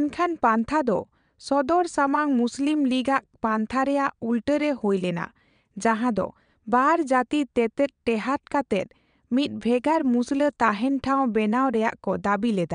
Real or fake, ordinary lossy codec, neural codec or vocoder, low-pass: fake; none; vocoder, 22.05 kHz, 80 mel bands, WaveNeXt; 9.9 kHz